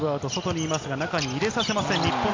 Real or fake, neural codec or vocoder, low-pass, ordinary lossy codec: real; none; 7.2 kHz; MP3, 48 kbps